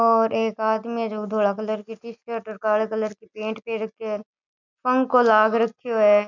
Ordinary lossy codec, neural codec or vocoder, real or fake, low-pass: none; none; real; 7.2 kHz